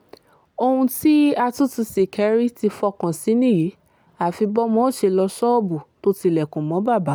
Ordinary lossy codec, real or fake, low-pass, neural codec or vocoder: none; real; none; none